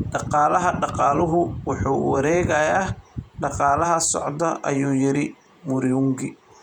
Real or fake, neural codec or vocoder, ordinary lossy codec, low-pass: fake; vocoder, 48 kHz, 128 mel bands, Vocos; none; 19.8 kHz